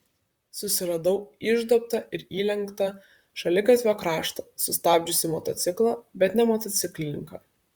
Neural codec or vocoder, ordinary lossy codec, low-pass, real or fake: vocoder, 44.1 kHz, 128 mel bands, Pupu-Vocoder; Opus, 64 kbps; 19.8 kHz; fake